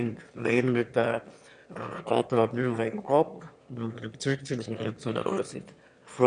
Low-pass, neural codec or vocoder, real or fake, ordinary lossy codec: 9.9 kHz; autoencoder, 22.05 kHz, a latent of 192 numbers a frame, VITS, trained on one speaker; fake; none